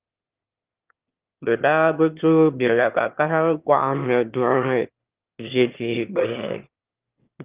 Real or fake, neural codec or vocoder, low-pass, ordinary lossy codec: fake; autoencoder, 22.05 kHz, a latent of 192 numbers a frame, VITS, trained on one speaker; 3.6 kHz; Opus, 32 kbps